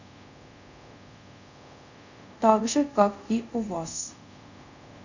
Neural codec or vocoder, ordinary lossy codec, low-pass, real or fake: codec, 24 kHz, 0.5 kbps, DualCodec; none; 7.2 kHz; fake